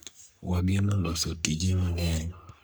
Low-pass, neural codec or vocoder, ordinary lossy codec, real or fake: none; codec, 44.1 kHz, 3.4 kbps, Pupu-Codec; none; fake